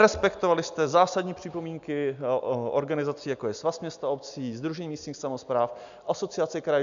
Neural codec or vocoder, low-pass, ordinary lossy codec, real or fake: none; 7.2 kHz; AAC, 96 kbps; real